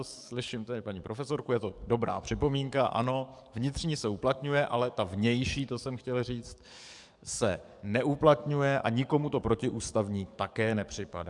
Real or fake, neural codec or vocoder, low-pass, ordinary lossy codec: fake; codec, 44.1 kHz, 7.8 kbps, DAC; 10.8 kHz; MP3, 96 kbps